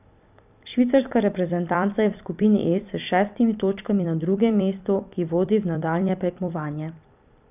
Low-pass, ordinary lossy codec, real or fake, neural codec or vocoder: 3.6 kHz; none; fake; vocoder, 44.1 kHz, 128 mel bands every 256 samples, BigVGAN v2